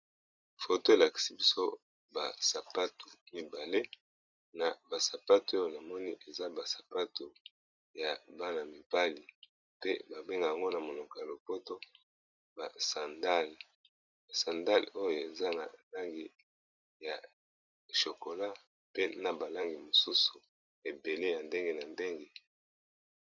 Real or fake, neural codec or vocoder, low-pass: fake; vocoder, 44.1 kHz, 128 mel bands every 256 samples, BigVGAN v2; 7.2 kHz